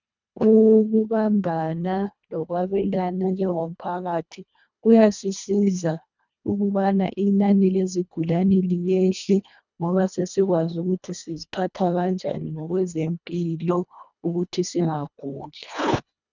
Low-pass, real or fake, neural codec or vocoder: 7.2 kHz; fake; codec, 24 kHz, 1.5 kbps, HILCodec